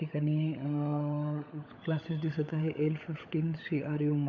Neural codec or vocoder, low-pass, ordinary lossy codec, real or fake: codec, 16 kHz, 16 kbps, FunCodec, trained on LibriTTS, 50 frames a second; 7.2 kHz; none; fake